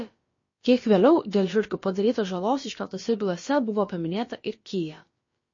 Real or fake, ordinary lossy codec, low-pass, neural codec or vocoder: fake; MP3, 32 kbps; 7.2 kHz; codec, 16 kHz, about 1 kbps, DyCAST, with the encoder's durations